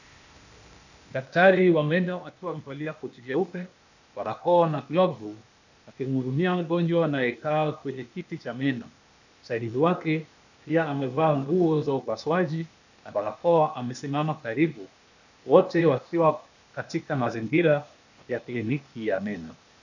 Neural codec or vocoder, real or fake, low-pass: codec, 16 kHz, 0.8 kbps, ZipCodec; fake; 7.2 kHz